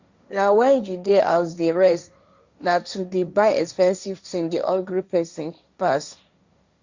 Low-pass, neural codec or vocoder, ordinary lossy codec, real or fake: 7.2 kHz; codec, 16 kHz, 1.1 kbps, Voila-Tokenizer; Opus, 64 kbps; fake